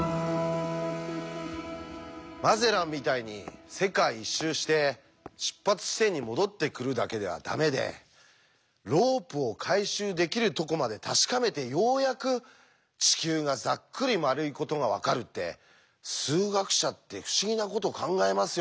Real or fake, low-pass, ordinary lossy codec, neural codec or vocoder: real; none; none; none